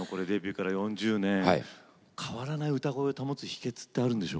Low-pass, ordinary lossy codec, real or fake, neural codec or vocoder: none; none; real; none